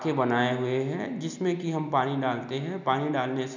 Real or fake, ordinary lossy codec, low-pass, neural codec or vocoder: real; none; 7.2 kHz; none